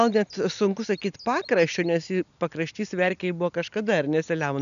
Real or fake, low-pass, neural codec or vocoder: real; 7.2 kHz; none